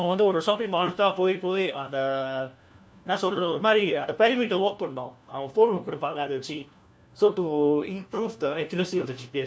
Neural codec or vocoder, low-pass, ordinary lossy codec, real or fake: codec, 16 kHz, 1 kbps, FunCodec, trained on LibriTTS, 50 frames a second; none; none; fake